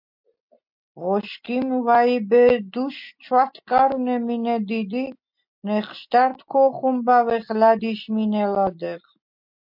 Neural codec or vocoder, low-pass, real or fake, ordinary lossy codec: none; 5.4 kHz; real; MP3, 48 kbps